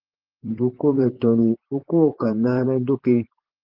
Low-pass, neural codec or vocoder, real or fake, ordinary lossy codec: 5.4 kHz; vocoder, 44.1 kHz, 128 mel bands, Pupu-Vocoder; fake; Opus, 16 kbps